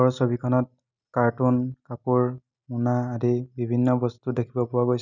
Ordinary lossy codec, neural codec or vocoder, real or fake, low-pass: none; none; real; 7.2 kHz